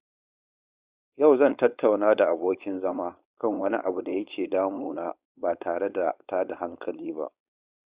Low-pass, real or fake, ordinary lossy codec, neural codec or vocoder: 3.6 kHz; fake; Opus, 64 kbps; codec, 16 kHz, 4.8 kbps, FACodec